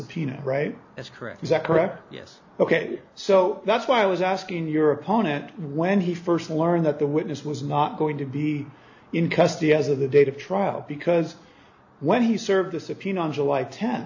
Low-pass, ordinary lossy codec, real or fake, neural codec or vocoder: 7.2 kHz; AAC, 48 kbps; real; none